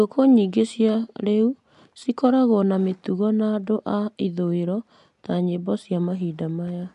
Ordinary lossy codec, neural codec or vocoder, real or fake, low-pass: MP3, 96 kbps; none; real; 10.8 kHz